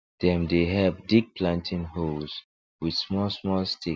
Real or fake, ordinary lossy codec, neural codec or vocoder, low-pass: real; none; none; none